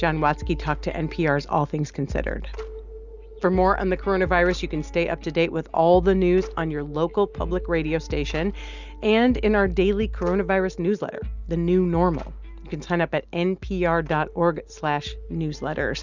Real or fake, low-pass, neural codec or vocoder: real; 7.2 kHz; none